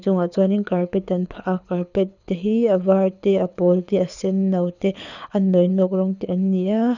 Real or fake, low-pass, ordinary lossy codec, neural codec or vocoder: fake; 7.2 kHz; none; codec, 24 kHz, 6 kbps, HILCodec